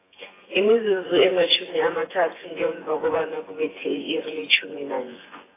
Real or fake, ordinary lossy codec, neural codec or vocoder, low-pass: fake; AAC, 16 kbps; vocoder, 24 kHz, 100 mel bands, Vocos; 3.6 kHz